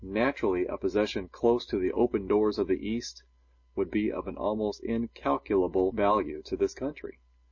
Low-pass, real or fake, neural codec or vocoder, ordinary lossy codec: 7.2 kHz; real; none; MP3, 32 kbps